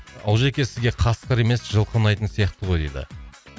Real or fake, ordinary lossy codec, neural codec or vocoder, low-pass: real; none; none; none